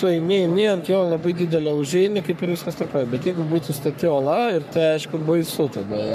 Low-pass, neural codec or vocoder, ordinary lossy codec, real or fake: 14.4 kHz; codec, 44.1 kHz, 3.4 kbps, Pupu-Codec; MP3, 96 kbps; fake